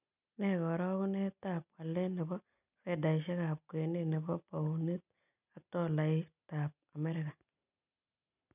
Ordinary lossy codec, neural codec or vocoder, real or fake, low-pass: none; none; real; 3.6 kHz